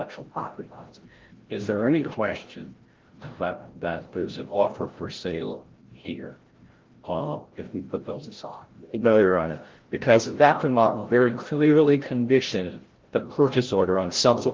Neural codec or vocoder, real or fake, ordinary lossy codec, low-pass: codec, 16 kHz, 0.5 kbps, FreqCodec, larger model; fake; Opus, 16 kbps; 7.2 kHz